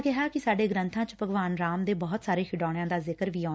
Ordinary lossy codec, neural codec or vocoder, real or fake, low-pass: none; none; real; none